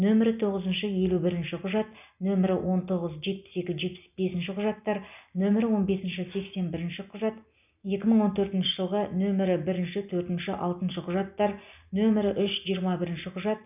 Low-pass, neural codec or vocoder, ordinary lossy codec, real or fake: 3.6 kHz; none; none; real